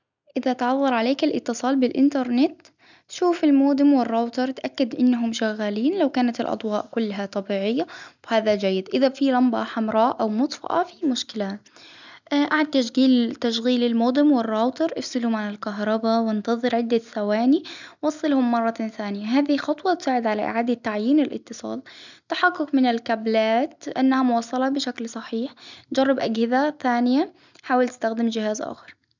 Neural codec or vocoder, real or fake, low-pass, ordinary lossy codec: none; real; 7.2 kHz; none